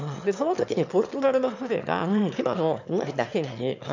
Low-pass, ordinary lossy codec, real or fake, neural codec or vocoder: 7.2 kHz; MP3, 64 kbps; fake; autoencoder, 22.05 kHz, a latent of 192 numbers a frame, VITS, trained on one speaker